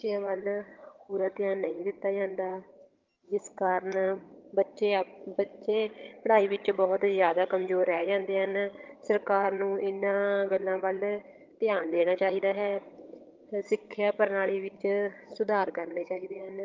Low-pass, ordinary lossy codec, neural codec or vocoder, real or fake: 7.2 kHz; Opus, 32 kbps; vocoder, 22.05 kHz, 80 mel bands, HiFi-GAN; fake